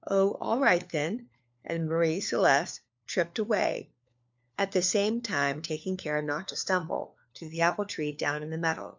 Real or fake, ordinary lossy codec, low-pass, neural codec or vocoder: fake; MP3, 64 kbps; 7.2 kHz; codec, 16 kHz, 4 kbps, FunCodec, trained on LibriTTS, 50 frames a second